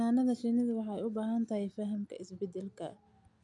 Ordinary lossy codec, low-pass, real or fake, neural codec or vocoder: none; 10.8 kHz; real; none